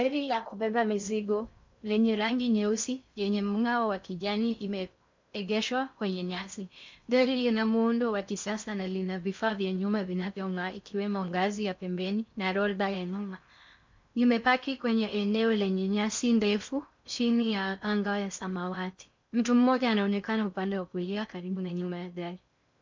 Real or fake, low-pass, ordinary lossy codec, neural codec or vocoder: fake; 7.2 kHz; MP3, 64 kbps; codec, 16 kHz in and 24 kHz out, 0.6 kbps, FocalCodec, streaming, 2048 codes